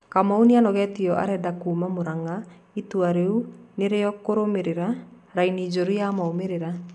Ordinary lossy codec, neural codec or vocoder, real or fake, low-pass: none; none; real; 10.8 kHz